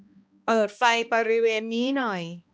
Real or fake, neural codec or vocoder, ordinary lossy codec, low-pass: fake; codec, 16 kHz, 1 kbps, X-Codec, HuBERT features, trained on balanced general audio; none; none